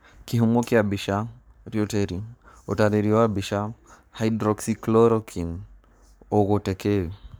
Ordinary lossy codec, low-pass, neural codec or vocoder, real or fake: none; none; codec, 44.1 kHz, 7.8 kbps, Pupu-Codec; fake